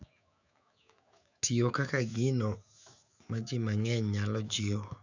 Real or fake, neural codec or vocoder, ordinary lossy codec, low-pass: fake; autoencoder, 48 kHz, 128 numbers a frame, DAC-VAE, trained on Japanese speech; none; 7.2 kHz